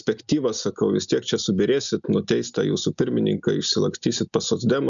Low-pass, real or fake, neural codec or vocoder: 7.2 kHz; real; none